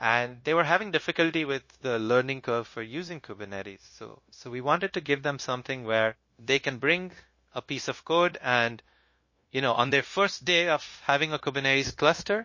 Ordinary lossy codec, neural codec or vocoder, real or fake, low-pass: MP3, 32 kbps; codec, 16 kHz, 0.9 kbps, LongCat-Audio-Codec; fake; 7.2 kHz